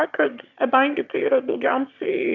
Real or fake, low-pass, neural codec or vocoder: fake; 7.2 kHz; autoencoder, 22.05 kHz, a latent of 192 numbers a frame, VITS, trained on one speaker